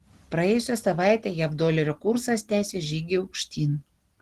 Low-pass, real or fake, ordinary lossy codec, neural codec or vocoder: 14.4 kHz; real; Opus, 16 kbps; none